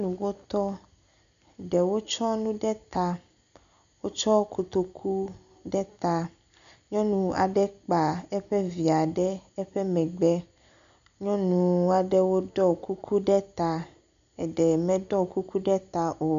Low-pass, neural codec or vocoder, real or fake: 7.2 kHz; none; real